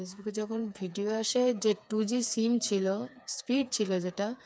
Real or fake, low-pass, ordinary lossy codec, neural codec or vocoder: fake; none; none; codec, 16 kHz, 4 kbps, FreqCodec, smaller model